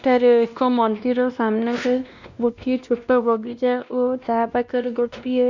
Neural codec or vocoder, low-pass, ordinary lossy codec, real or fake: codec, 16 kHz, 1 kbps, X-Codec, WavLM features, trained on Multilingual LibriSpeech; 7.2 kHz; none; fake